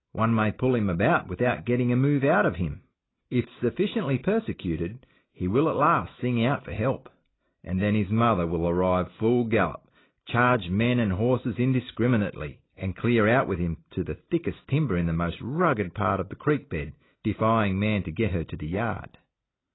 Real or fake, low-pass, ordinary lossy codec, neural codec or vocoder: real; 7.2 kHz; AAC, 16 kbps; none